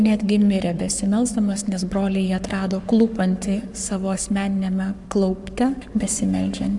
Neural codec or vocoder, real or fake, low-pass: codec, 44.1 kHz, 7.8 kbps, Pupu-Codec; fake; 10.8 kHz